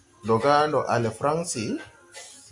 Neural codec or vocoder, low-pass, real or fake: none; 10.8 kHz; real